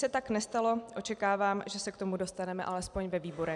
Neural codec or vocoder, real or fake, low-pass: none; real; 10.8 kHz